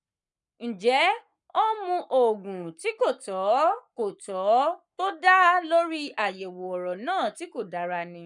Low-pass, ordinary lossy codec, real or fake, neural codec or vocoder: 10.8 kHz; none; fake; codec, 44.1 kHz, 7.8 kbps, Pupu-Codec